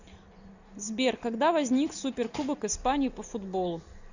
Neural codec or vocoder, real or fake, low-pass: none; real; 7.2 kHz